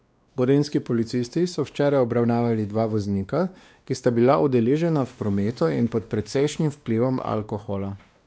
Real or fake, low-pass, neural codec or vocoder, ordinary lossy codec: fake; none; codec, 16 kHz, 2 kbps, X-Codec, WavLM features, trained on Multilingual LibriSpeech; none